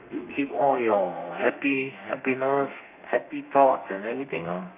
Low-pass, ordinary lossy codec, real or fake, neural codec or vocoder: 3.6 kHz; none; fake; codec, 32 kHz, 1.9 kbps, SNAC